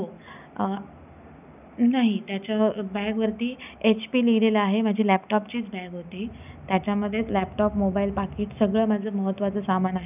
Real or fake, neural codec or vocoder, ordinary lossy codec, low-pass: real; none; none; 3.6 kHz